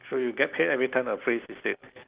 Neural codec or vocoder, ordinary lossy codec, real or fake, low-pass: none; Opus, 32 kbps; real; 3.6 kHz